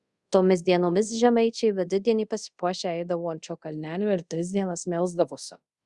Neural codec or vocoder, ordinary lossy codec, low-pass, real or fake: codec, 24 kHz, 0.5 kbps, DualCodec; Opus, 64 kbps; 10.8 kHz; fake